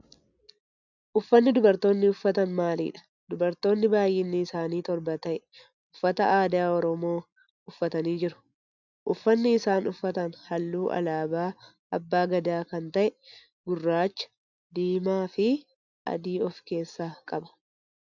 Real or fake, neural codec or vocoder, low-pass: real; none; 7.2 kHz